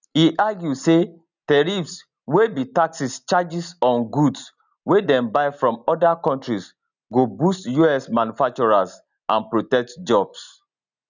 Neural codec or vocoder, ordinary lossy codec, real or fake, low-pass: none; none; real; 7.2 kHz